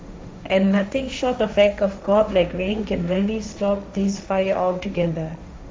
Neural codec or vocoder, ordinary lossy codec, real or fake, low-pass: codec, 16 kHz, 1.1 kbps, Voila-Tokenizer; none; fake; none